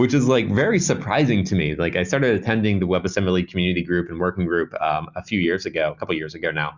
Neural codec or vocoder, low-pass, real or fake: none; 7.2 kHz; real